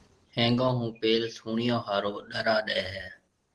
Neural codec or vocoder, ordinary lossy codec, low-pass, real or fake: none; Opus, 16 kbps; 10.8 kHz; real